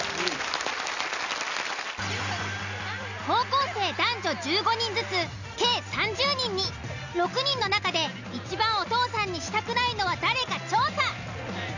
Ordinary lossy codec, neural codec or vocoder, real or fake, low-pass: none; none; real; 7.2 kHz